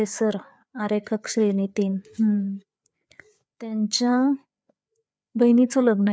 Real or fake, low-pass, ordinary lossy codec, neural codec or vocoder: fake; none; none; codec, 16 kHz, 4 kbps, FreqCodec, larger model